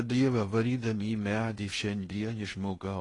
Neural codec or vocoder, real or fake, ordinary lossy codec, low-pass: codec, 16 kHz in and 24 kHz out, 0.8 kbps, FocalCodec, streaming, 65536 codes; fake; AAC, 32 kbps; 10.8 kHz